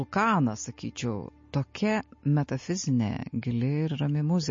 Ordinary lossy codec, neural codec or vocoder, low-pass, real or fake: MP3, 32 kbps; none; 7.2 kHz; real